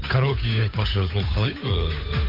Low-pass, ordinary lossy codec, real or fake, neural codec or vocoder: 5.4 kHz; AAC, 32 kbps; fake; codec, 16 kHz, 8 kbps, FunCodec, trained on Chinese and English, 25 frames a second